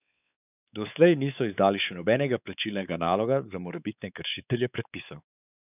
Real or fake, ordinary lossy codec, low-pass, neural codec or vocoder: fake; none; 3.6 kHz; codec, 16 kHz, 4 kbps, X-Codec, WavLM features, trained on Multilingual LibriSpeech